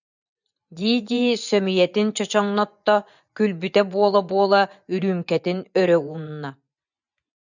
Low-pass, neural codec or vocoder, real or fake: 7.2 kHz; vocoder, 24 kHz, 100 mel bands, Vocos; fake